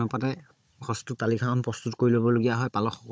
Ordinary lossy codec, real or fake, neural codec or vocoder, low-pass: none; fake; codec, 16 kHz, 16 kbps, FunCodec, trained on Chinese and English, 50 frames a second; none